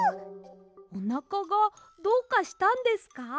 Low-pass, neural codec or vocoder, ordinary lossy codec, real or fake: none; none; none; real